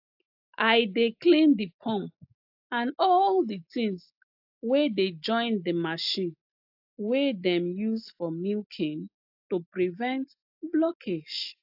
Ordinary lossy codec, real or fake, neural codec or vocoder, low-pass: AAC, 48 kbps; real; none; 5.4 kHz